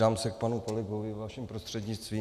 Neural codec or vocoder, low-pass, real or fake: none; 14.4 kHz; real